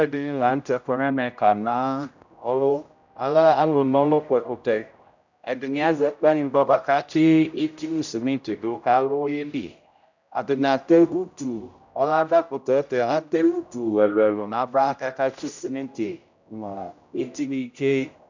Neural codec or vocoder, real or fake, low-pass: codec, 16 kHz, 0.5 kbps, X-Codec, HuBERT features, trained on general audio; fake; 7.2 kHz